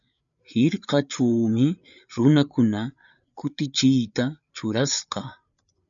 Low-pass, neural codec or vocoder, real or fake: 7.2 kHz; codec, 16 kHz, 8 kbps, FreqCodec, larger model; fake